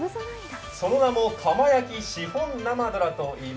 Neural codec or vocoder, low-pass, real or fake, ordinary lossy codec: none; none; real; none